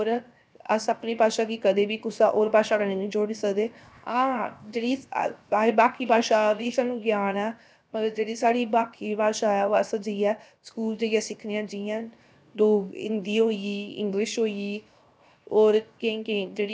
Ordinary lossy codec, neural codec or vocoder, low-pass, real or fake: none; codec, 16 kHz, 0.7 kbps, FocalCodec; none; fake